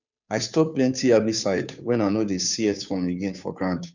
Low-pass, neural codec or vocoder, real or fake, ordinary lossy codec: 7.2 kHz; codec, 16 kHz, 2 kbps, FunCodec, trained on Chinese and English, 25 frames a second; fake; none